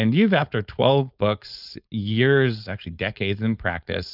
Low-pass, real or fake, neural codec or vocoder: 5.4 kHz; fake; codec, 16 kHz, 4.8 kbps, FACodec